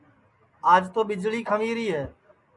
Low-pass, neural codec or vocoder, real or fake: 10.8 kHz; none; real